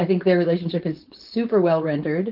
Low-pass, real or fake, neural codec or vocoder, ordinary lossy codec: 5.4 kHz; fake; codec, 16 kHz, 4.8 kbps, FACodec; Opus, 24 kbps